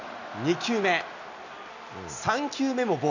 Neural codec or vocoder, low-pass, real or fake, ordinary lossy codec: none; 7.2 kHz; real; none